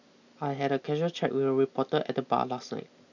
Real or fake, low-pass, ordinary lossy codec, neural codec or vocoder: real; 7.2 kHz; none; none